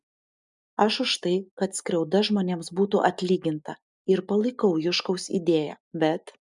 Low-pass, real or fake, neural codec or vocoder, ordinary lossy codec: 10.8 kHz; real; none; MP3, 96 kbps